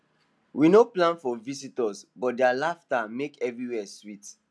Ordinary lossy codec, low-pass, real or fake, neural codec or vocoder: none; none; real; none